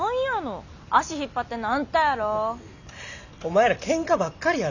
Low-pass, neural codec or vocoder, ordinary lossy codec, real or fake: 7.2 kHz; none; none; real